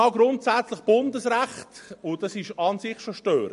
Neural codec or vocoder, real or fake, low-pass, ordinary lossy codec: none; real; 14.4 kHz; MP3, 48 kbps